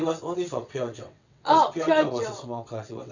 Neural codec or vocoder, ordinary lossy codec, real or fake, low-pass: vocoder, 22.05 kHz, 80 mel bands, WaveNeXt; none; fake; 7.2 kHz